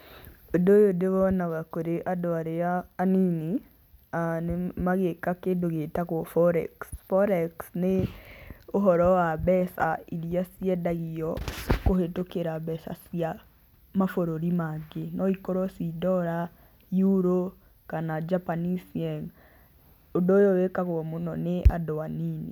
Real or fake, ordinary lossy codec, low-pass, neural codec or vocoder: real; none; 19.8 kHz; none